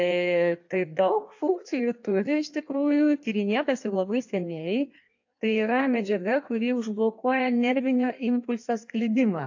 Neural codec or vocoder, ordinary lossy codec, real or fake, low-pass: codec, 16 kHz in and 24 kHz out, 1.1 kbps, FireRedTTS-2 codec; AAC, 48 kbps; fake; 7.2 kHz